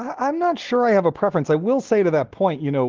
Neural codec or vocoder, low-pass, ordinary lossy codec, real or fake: none; 7.2 kHz; Opus, 16 kbps; real